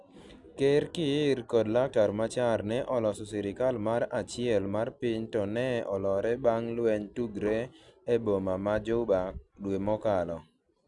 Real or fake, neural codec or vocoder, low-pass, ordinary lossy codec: real; none; 10.8 kHz; none